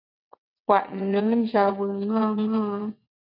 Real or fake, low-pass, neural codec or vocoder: fake; 5.4 kHz; vocoder, 22.05 kHz, 80 mel bands, WaveNeXt